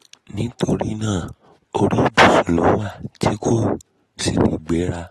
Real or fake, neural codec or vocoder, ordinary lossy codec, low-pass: real; none; AAC, 32 kbps; 19.8 kHz